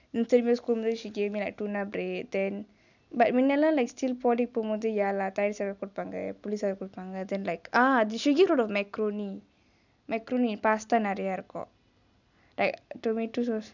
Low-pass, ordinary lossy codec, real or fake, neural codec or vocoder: 7.2 kHz; none; real; none